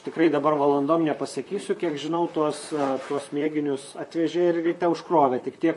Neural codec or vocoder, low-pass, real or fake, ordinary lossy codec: vocoder, 44.1 kHz, 128 mel bands, Pupu-Vocoder; 14.4 kHz; fake; MP3, 48 kbps